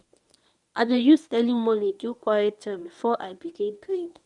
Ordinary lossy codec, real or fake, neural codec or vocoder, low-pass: none; fake; codec, 24 kHz, 0.9 kbps, WavTokenizer, medium speech release version 1; 10.8 kHz